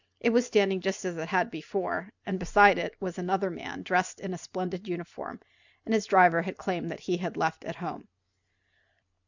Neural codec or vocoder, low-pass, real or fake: none; 7.2 kHz; real